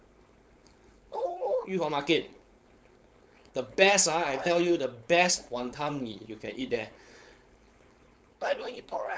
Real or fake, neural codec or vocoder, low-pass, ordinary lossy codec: fake; codec, 16 kHz, 4.8 kbps, FACodec; none; none